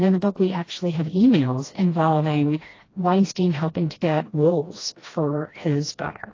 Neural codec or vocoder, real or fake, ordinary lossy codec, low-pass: codec, 16 kHz, 1 kbps, FreqCodec, smaller model; fake; AAC, 32 kbps; 7.2 kHz